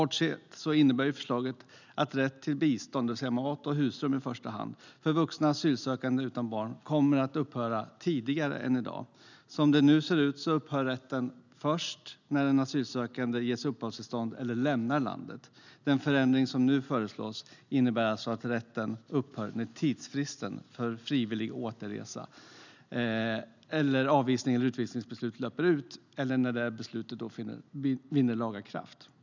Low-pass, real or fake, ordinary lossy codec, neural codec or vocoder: 7.2 kHz; real; none; none